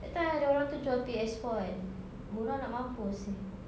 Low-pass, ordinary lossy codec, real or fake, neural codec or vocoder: none; none; real; none